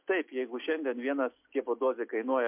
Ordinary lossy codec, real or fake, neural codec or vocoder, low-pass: MP3, 32 kbps; real; none; 3.6 kHz